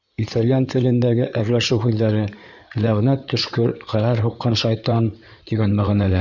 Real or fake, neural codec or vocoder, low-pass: fake; codec, 16 kHz in and 24 kHz out, 2.2 kbps, FireRedTTS-2 codec; 7.2 kHz